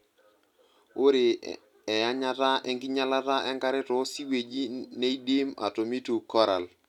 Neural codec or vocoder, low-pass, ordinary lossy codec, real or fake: none; 19.8 kHz; none; real